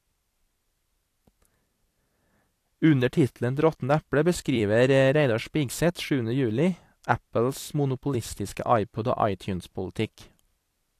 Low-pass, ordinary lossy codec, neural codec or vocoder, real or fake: 14.4 kHz; AAC, 64 kbps; vocoder, 44.1 kHz, 128 mel bands every 256 samples, BigVGAN v2; fake